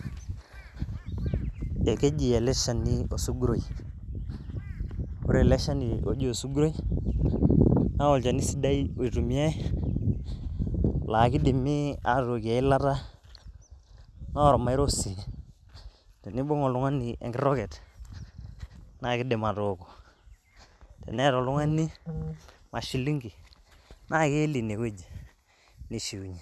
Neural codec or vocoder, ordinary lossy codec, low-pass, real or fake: none; none; none; real